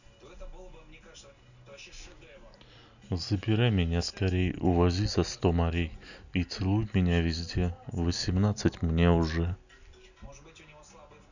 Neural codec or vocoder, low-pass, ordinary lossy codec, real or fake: none; 7.2 kHz; none; real